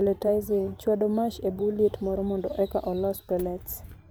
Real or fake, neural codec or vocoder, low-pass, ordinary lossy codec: fake; vocoder, 44.1 kHz, 128 mel bands every 512 samples, BigVGAN v2; none; none